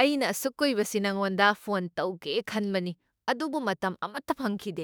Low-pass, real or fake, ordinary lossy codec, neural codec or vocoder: none; fake; none; autoencoder, 48 kHz, 32 numbers a frame, DAC-VAE, trained on Japanese speech